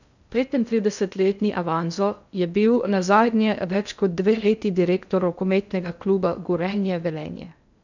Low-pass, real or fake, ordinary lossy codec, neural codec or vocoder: 7.2 kHz; fake; none; codec, 16 kHz in and 24 kHz out, 0.6 kbps, FocalCodec, streaming, 2048 codes